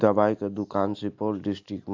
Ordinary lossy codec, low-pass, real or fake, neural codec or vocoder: AAC, 48 kbps; 7.2 kHz; real; none